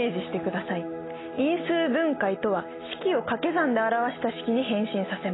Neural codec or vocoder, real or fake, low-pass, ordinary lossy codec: none; real; 7.2 kHz; AAC, 16 kbps